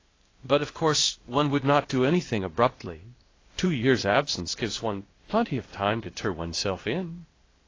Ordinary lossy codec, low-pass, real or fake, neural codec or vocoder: AAC, 32 kbps; 7.2 kHz; fake; codec, 16 kHz, 0.8 kbps, ZipCodec